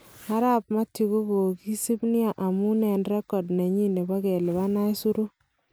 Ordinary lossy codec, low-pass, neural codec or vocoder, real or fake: none; none; none; real